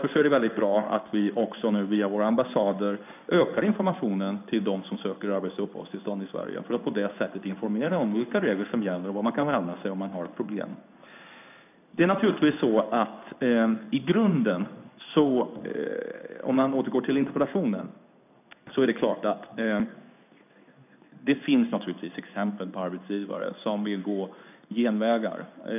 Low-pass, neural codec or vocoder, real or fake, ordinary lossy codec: 3.6 kHz; codec, 16 kHz in and 24 kHz out, 1 kbps, XY-Tokenizer; fake; none